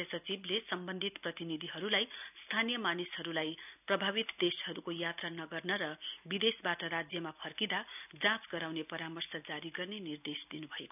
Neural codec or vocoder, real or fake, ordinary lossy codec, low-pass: none; real; none; 3.6 kHz